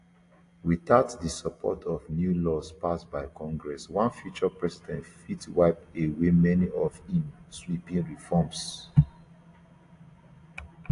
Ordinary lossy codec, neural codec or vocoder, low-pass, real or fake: AAC, 48 kbps; none; 10.8 kHz; real